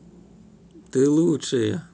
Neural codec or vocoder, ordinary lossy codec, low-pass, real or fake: none; none; none; real